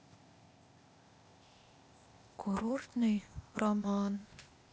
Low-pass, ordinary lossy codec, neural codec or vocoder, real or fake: none; none; codec, 16 kHz, 0.8 kbps, ZipCodec; fake